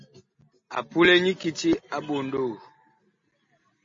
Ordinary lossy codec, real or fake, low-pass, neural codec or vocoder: MP3, 32 kbps; real; 7.2 kHz; none